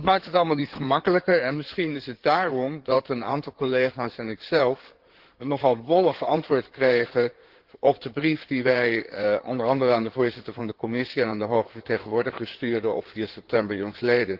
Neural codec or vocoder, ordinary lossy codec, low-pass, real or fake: codec, 16 kHz in and 24 kHz out, 2.2 kbps, FireRedTTS-2 codec; Opus, 16 kbps; 5.4 kHz; fake